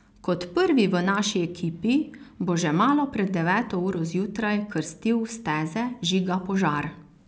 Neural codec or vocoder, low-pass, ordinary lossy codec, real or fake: none; none; none; real